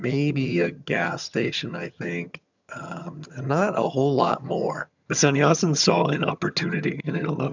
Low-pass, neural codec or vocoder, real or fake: 7.2 kHz; vocoder, 22.05 kHz, 80 mel bands, HiFi-GAN; fake